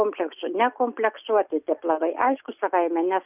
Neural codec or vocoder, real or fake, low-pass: none; real; 3.6 kHz